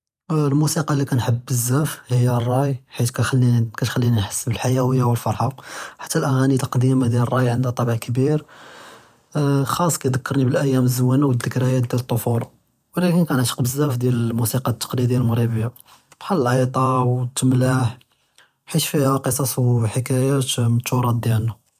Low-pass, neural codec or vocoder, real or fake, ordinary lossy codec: 14.4 kHz; vocoder, 44.1 kHz, 128 mel bands every 512 samples, BigVGAN v2; fake; none